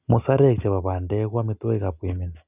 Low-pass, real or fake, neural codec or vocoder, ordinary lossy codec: 3.6 kHz; real; none; none